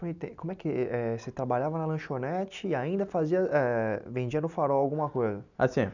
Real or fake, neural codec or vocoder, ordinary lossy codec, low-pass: real; none; none; 7.2 kHz